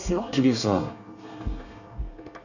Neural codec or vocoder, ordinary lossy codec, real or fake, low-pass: codec, 24 kHz, 1 kbps, SNAC; none; fake; 7.2 kHz